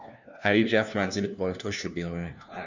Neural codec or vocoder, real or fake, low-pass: codec, 16 kHz, 1 kbps, FunCodec, trained on LibriTTS, 50 frames a second; fake; 7.2 kHz